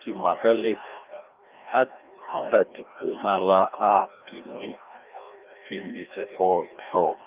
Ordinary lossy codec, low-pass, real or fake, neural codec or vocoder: Opus, 32 kbps; 3.6 kHz; fake; codec, 16 kHz, 1 kbps, FreqCodec, larger model